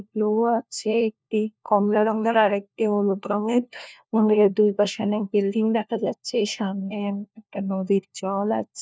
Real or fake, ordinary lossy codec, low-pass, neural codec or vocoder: fake; none; none; codec, 16 kHz, 1 kbps, FunCodec, trained on LibriTTS, 50 frames a second